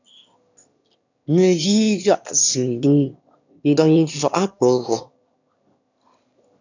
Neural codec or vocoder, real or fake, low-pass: autoencoder, 22.05 kHz, a latent of 192 numbers a frame, VITS, trained on one speaker; fake; 7.2 kHz